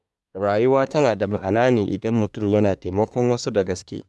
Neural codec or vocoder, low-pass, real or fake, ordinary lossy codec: codec, 24 kHz, 1 kbps, SNAC; none; fake; none